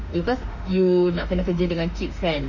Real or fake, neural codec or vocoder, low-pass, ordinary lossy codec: fake; autoencoder, 48 kHz, 32 numbers a frame, DAC-VAE, trained on Japanese speech; 7.2 kHz; none